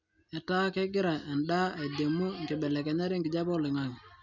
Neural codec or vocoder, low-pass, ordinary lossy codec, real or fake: none; 7.2 kHz; none; real